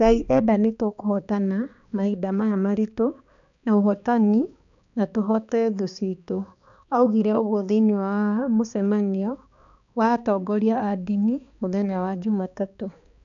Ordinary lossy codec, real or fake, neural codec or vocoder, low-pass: none; fake; codec, 16 kHz, 2 kbps, X-Codec, HuBERT features, trained on balanced general audio; 7.2 kHz